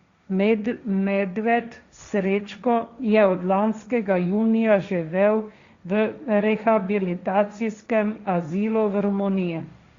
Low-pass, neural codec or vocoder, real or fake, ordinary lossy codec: 7.2 kHz; codec, 16 kHz, 1.1 kbps, Voila-Tokenizer; fake; Opus, 64 kbps